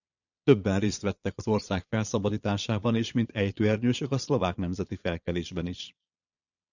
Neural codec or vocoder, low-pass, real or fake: vocoder, 22.05 kHz, 80 mel bands, Vocos; 7.2 kHz; fake